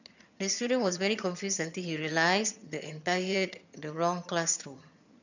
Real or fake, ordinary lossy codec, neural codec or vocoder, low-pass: fake; none; vocoder, 22.05 kHz, 80 mel bands, HiFi-GAN; 7.2 kHz